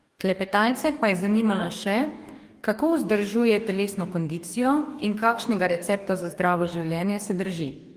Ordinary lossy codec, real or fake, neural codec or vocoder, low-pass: Opus, 32 kbps; fake; codec, 44.1 kHz, 2.6 kbps, DAC; 14.4 kHz